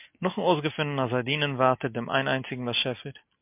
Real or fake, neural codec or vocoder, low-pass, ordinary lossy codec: real; none; 3.6 kHz; MP3, 32 kbps